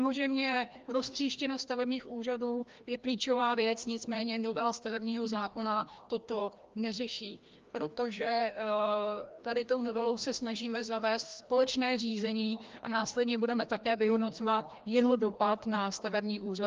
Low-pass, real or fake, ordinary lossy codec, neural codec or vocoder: 7.2 kHz; fake; Opus, 32 kbps; codec, 16 kHz, 1 kbps, FreqCodec, larger model